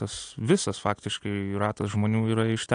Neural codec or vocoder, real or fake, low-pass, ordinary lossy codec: none; real; 9.9 kHz; AAC, 48 kbps